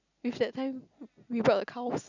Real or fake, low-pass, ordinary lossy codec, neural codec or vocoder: real; 7.2 kHz; none; none